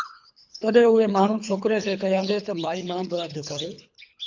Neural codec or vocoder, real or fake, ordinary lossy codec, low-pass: codec, 24 kHz, 3 kbps, HILCodec; fake; MP3, 64 kbps; 7.2 kHz